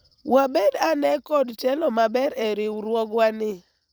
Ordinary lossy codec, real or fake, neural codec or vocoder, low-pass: none; fake; vocoder, 44.1 kHz, 128 mel bands every 512 samples, BigVGAN v2; none